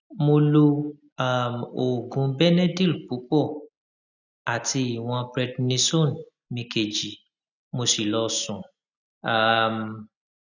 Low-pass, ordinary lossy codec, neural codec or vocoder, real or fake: 7.2 kHz; none; none; real